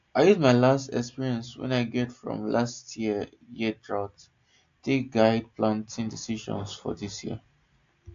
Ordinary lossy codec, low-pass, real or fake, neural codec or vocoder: AAC, 64 kbps; 7.2 kHz; real; none